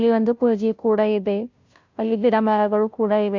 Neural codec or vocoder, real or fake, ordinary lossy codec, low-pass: codec, 16 kHz, 0.5 kbps, FunCodec, trained on Chinese and English, 25 frames a second; fake; MP3, 64 kbps; 7.2 kHz